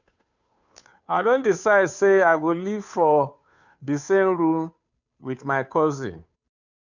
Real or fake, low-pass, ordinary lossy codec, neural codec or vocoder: fake; 7.2 kHz; none; codec, 16 kHz, 2 kbps, FunCodec, trained on Chinese and English, 25 frames a second